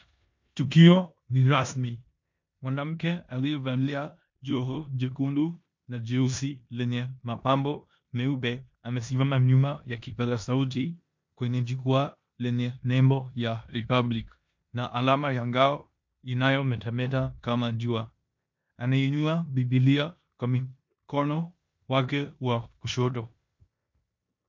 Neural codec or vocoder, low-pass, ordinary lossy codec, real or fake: codec, 16 kHz in and 24 kHz out, 0.9 kbps, LongCat-Audio-Codec, four codebook decoder; 7.2 kHz; MP3, 48 kbps; fake